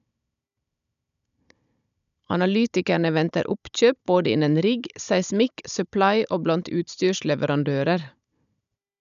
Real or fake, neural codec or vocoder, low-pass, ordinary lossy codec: fake; codec, 16 kHz, 16 kbps, FunCodec, trained on Chinese and English, 50 frames a second; 7.2 kHz; none